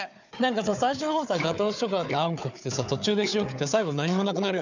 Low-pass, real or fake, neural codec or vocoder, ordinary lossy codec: 7.2 kHz; fake; codec, 16 kHz, 4 kbps, FunCodec, trained on Chinese and English, 50 frames a second; none